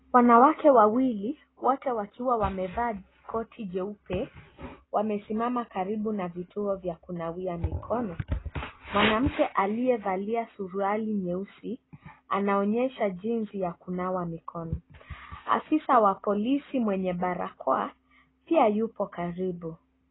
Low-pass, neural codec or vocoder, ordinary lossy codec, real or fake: 7.2 kHz; none; AAC, 16 kbps; real